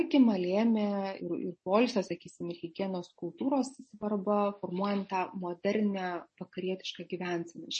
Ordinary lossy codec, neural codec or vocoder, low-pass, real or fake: MP3, 32 kbps; none; 10.8 kHz; real